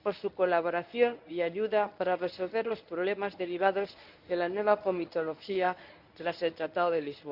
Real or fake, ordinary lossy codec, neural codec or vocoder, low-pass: fake; none; codec, 24 kHz, 0.9 kbps, WavTokenizer, medium speech release version 1; 5.4 kHz